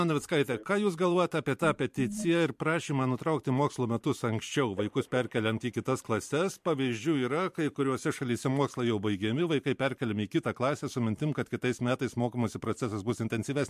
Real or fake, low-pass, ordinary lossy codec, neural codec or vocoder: fake; 14.4 kHz; MP3, 64 kbps; vocoder, 44.1 kHz, 128 mel bands every 512 samples, BigVGAN v2